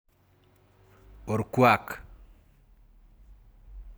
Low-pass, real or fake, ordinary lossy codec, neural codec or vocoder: none; real; none; none